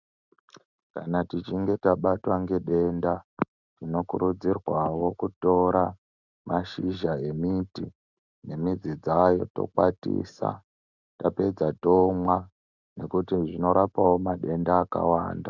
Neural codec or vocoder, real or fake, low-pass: none; real; 7.2 kHz